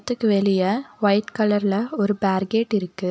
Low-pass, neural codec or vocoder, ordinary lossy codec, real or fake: none; none; none; real